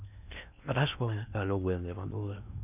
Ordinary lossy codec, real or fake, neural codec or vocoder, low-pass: none; fake; codec, 16 kHz in and 24 kHz out, 0.6 kbps, FocalCodec, streaming, 4096 codes; 3.6 kHz